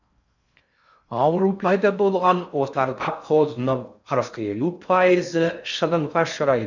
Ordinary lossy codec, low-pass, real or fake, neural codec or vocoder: none; 7.2 kHz; fake; codec, 16 kHz in and 24 kHz out, 0.6 kbps, FocalCodec, streaming, 4096 codes